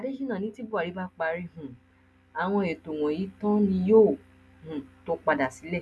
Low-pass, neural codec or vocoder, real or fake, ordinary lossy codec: none; none; real; none